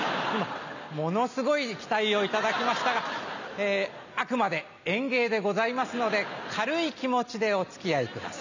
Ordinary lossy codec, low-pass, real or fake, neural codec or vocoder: none; 7.2 kHz; real; none